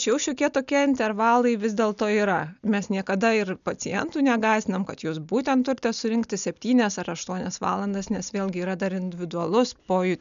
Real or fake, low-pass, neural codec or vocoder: real; 7.2 kHz; none